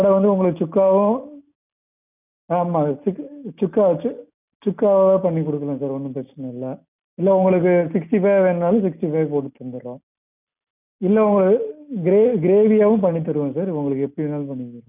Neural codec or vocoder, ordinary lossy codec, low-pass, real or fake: none; none; 3.6 kHz; real